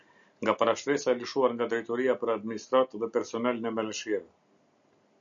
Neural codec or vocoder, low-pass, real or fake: none; 7.2 kHz; real